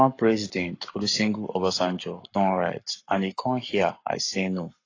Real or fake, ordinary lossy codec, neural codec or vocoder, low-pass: fake; AAC, 32 kbps; codec, 44.1 kHz, 7.8 kbps, Pupu-Codec; 7.2 kHz